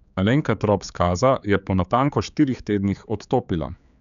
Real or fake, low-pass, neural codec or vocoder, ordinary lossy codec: fake; 7.2 kHz; codec, 16 kHz, 4 kbps, X-Codec, HuBERT features, trained on general audio; none